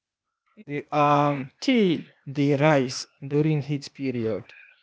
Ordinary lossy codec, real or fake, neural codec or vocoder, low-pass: none; fake; codec, 16 kHz, 0.8 kbps, ZipCodec; none